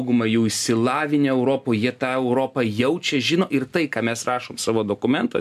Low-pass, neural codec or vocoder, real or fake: 14.4 kHz; none; real